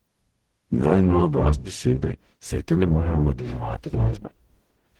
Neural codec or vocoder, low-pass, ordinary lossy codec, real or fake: codec, 44.1 kHz, 0.9 kbps, DAC; 19.8 kHz; Opus, 16 kbps; fake